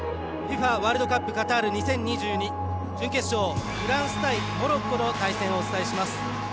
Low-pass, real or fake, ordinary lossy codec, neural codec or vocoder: none; real; none; none